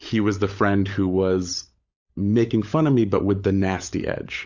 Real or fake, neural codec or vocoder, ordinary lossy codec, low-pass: fake; codec, 16 kHz, 16 kbps, FunCodec, trained on LibriTTS, 50 frames a second; Opus, 64 kbps; 7.2 kHz